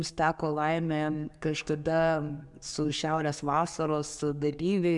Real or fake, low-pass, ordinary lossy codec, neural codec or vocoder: fake; 10.8 kHz; MP3, 96 kbps; codec, 44.1 kHz, 7.8 kbps, DAC